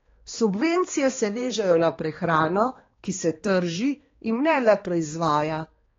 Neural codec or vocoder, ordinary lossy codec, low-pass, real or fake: codec, 16 kHz, 2 kbps, X-Codec, HuBERT features, trained on balanced general audio; AAC, 32 kbps; 7.2 kHz; fake